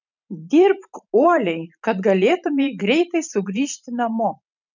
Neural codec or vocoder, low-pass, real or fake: vocoder, 44.1 kHz, 128 mel bands every 512 samples, BigVGAN v2; 7.2 kHz; fake